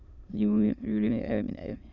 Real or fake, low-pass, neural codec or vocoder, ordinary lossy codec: fake; 7.2 kHz; autoencoder, 22.05 kHz, a latent of 192 numbers a frame, VITS, trained on many speakers; none